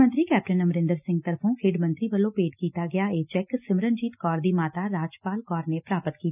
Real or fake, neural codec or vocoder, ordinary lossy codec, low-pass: real; none; none; 3.6 kHz